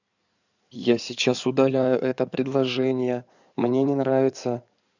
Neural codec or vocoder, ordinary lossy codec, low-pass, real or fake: codec, 16 kHz in and 24 kHz out, 2.2 kbps, FireRedTTS-2 codec; none; 7.2 kHz; fake